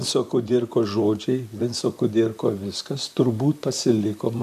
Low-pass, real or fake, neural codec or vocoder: 14.4 kHz; fake; vocoder, 44.1 kHz, 128 mel bands, Pupu-Vocoder